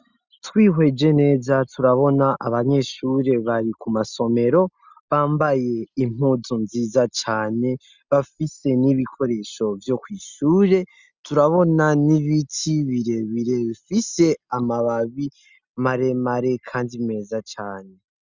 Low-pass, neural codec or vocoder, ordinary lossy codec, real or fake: 7.2 kHz; none; Opus, 64 kbps; real